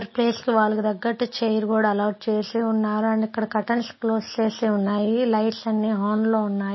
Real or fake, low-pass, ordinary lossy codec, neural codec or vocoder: real; 7.2 kHz; MP3, 24 kbps; none